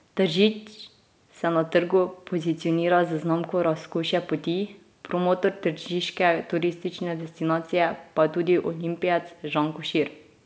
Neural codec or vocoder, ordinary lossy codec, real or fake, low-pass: none; none; real; none